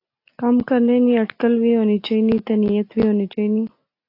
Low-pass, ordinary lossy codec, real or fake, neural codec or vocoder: 5.4 kHz; AAC, 32 kbps; real; none